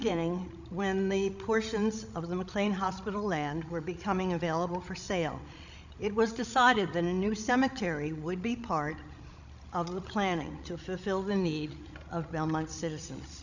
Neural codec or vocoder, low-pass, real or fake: codec, 16 kHz, 16 kbps, FreqCodec, larger model; 7.2 kHz; fake